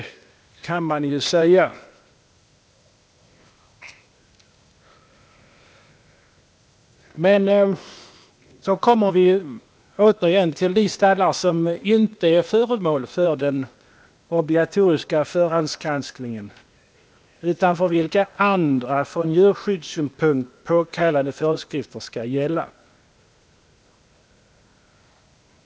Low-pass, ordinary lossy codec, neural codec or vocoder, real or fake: none; none; codec, 16 kHz, 0.8 kbps, ZipCodec; fake